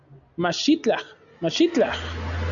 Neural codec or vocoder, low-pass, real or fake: none; 7.2 kHz; real